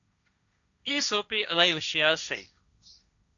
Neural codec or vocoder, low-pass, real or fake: codec, 16 kHz, 1.1 kbps, Voila-Tokenizer; 7.2 kHz; fake